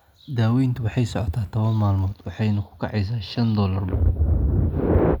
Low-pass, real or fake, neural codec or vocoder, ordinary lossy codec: 19.8 kHz; real; none; none